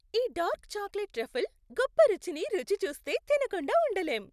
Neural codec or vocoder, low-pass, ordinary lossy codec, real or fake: none; 14.4 kHz; none; real